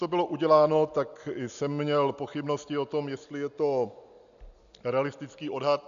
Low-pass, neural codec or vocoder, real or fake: 7.2 kHz; none; real